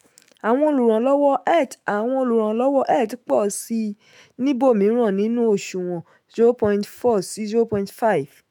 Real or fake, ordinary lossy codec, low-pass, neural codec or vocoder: fake; MP3, 96 kbps; 19.8 kHz; autoencoder, 48 kHz, 128 numbers a frame, DAC-VAE, trained on Japanese speech